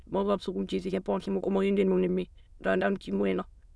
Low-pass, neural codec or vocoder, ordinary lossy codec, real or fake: none; autoencoder, 22.05 kHz, a latent of 192 numbers a frame, VITS, trained on many speakers; none; fake